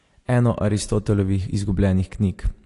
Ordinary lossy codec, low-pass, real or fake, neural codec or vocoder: AAC, 48 kbps; 10.8 kHz; real; none